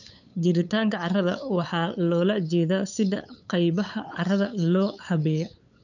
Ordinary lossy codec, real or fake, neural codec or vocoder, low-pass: none; fake; codec, 16 kHz, 8 kbps, FunCodec, trained on LibriTTS, 25 frames a second; 7.2 kHz